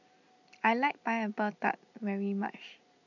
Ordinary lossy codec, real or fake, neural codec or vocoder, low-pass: none; real; none; 7.2 kHz